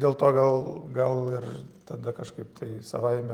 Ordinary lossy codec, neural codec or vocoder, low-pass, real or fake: Opus, 32 kbps; vocoder, 48 kHz, 128 mel bands, Vocos; 19.8 kHz; fake